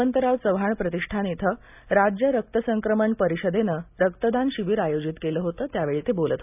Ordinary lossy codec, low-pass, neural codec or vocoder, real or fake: none; 3.6 kHz; none; real